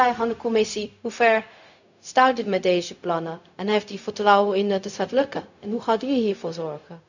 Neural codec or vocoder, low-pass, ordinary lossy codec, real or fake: codec, 16 kHz, 0.4 kbps, LongCat-Audio-Codec; 7.2 kHz; none; fake